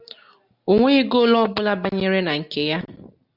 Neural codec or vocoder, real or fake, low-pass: none; real; 5.4 kHz